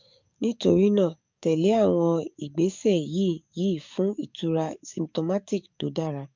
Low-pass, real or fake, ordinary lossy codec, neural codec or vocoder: 7.2 kHz; fake; MP3, 64 kbps; codec, 44.1 kHz, 7.8 kbps, DAC